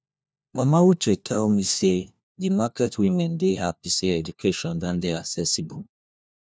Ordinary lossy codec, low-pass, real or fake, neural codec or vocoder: none; none; fake; codec, 16 kHz, 1 kbps, FunCodec, trained on LibriTTS, 50 frames a second